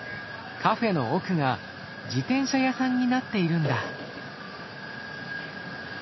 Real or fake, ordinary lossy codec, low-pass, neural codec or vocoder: real; MP3, 24 kbps; 7.2 kHz; none